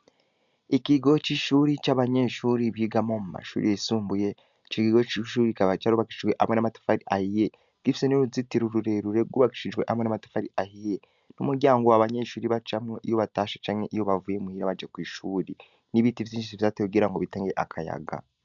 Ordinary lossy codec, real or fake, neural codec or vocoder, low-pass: MP3, 96 kbps; real; none; 7.2 kHz